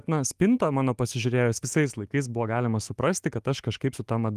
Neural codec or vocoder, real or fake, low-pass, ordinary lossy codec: autoencoder, 48 kHz, 128 numbers a frame, DAC-VAE, trained on Japanese speech; fake; 14.4 kHz; Opus, 24 kbps